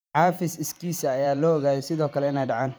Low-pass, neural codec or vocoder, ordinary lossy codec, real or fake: none; vocoder, 44.1 kHz, 128 mel bands every 512 samples, BigVGAN v2; none; fake